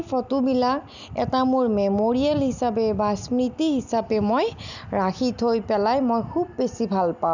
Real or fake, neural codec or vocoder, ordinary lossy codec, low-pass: real; none; none; 7.2 kHz